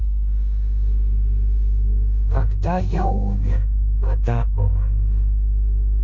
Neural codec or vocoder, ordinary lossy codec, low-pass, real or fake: codec, 16 kHz in and 24 kHz out, 0.9 kbps, LongCat-Audio-Codec, four codebook decoder; none; 7.2 kHz; fake